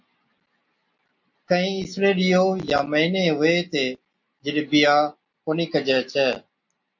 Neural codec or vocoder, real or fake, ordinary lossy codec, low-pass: none; real; MP3, 48 kbps; 7.2 kHz